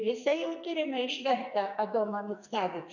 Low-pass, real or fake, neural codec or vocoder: 7.2 kHz; fake; codec, 44.1 kHz, 2.6 kbps, SNAC